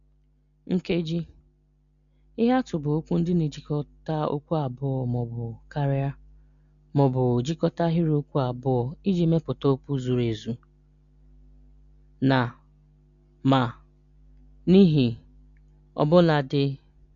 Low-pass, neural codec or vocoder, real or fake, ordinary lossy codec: 7.2 kHz; none; real; none